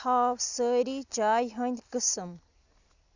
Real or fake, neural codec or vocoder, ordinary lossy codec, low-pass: real; none; Opus, 64 kbps; 7.2 kHz